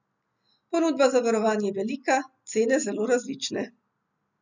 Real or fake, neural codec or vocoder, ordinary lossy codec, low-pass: real; none; none; 7.2 kHz